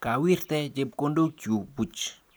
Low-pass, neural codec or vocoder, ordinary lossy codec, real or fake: none; none; none; real